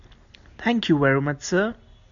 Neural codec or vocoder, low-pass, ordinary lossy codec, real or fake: none; 7.2 kHz; MP3, 96 kbps; real